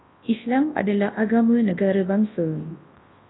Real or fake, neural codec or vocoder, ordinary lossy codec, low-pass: fake; codec, 24 kHz, 0.9 kbps, WavTokenizer, large speech release; AAC, 16 kbps; 7.2 kHz